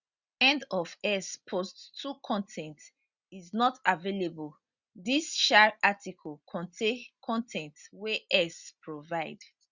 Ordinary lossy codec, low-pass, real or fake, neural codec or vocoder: Opus, 64 kbps; 7.2 kHz; real; none